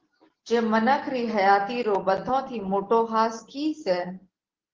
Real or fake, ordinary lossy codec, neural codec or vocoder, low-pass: real; Opus, 16 kbps; none; 7.2 kHz